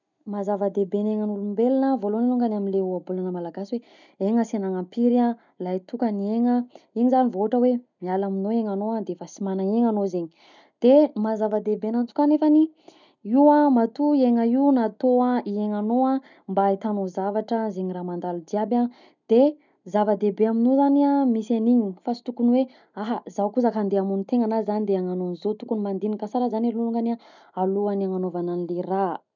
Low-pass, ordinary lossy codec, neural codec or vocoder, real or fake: 7.2 kHz; none; none; real